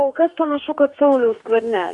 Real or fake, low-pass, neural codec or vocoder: fake; 10.8 kHz; codec, 44.1 kHz, 2.6 kbps, DAC